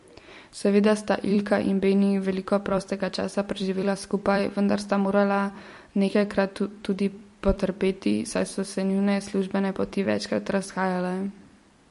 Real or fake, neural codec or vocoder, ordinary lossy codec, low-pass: fake; vocoder, 44.1 kHz, 128 mel bands every 256 samples, BigVGAN v2; MP3, 48 kbps; 14.4 kHz